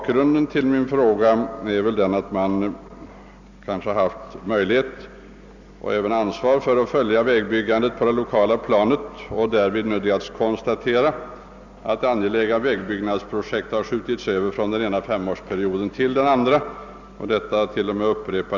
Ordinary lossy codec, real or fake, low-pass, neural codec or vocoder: Opus, 64 kbps; real; 7.2 kHz; none